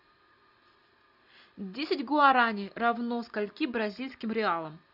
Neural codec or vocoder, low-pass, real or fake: none; 5.4 kHz; real